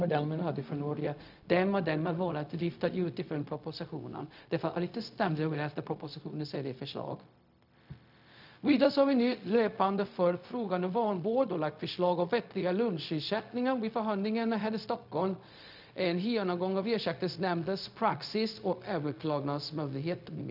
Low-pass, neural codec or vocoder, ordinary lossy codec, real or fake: 5.4 kHz; codec, 16 kHz, 0.4 kbps, LongCat-Audio-Codec; none; fake